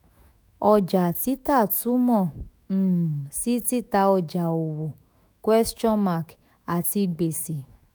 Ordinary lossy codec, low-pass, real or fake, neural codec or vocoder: none; none; fake; autoencoder, 48 kHz, 128 numbers a frame, DAC-VAE, trained on Japanese speech